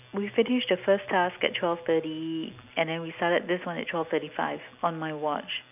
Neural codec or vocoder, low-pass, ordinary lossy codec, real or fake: none; 3.6 kHz; none; real